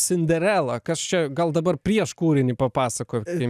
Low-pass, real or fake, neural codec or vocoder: 14.4 kHz; real; none